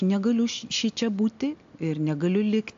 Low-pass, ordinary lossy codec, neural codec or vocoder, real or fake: 7.2 kHz; AAC, 64 kbps; none; real